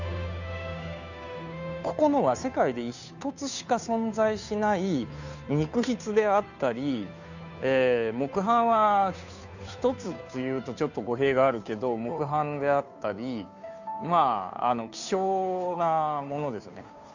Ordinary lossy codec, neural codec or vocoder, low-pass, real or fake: none; codec, 16 kHz, 2 kbps, FunCodec, trained on Chinese and English, 25 frames a second; 7.2 kHz; fake